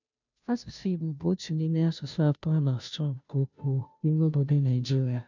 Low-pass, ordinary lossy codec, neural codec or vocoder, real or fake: 7.2 kHz; none; codec, 16 kHz, 0.5 kbps, FunCodec, trained on Chinese and English, 25 frames a second; fake